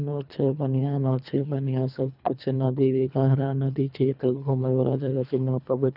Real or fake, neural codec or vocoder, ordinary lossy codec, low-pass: fake; codec, 24 kHz, 3 kbps, HILCodec; none; 5.4 kHz